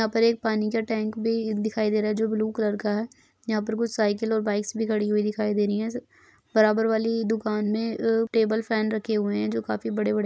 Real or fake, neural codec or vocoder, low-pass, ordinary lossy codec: real; none; none; none